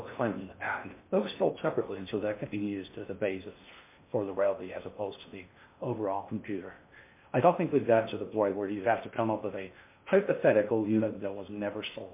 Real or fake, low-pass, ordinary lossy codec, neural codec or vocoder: fake; 3.6 kHz; MP3, 24 kbps; codec, 16 kHz in and 24 kHz out, 0.6 kbps, FocalCodec, streaming, 4096 codes